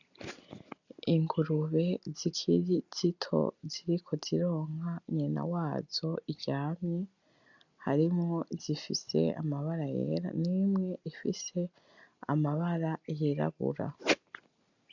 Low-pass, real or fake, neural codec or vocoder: 7.2 kHz; real; none